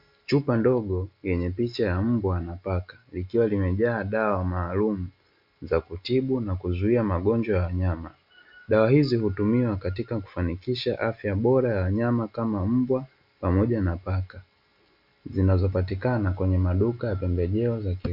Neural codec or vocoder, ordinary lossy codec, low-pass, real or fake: none; MP3, 48 kbps; 5.4 kHz; real